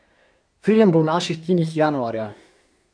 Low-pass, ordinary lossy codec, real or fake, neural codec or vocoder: 9.9 kHz; none; fake; codec, 24 kHz, 1 kbps, SNAC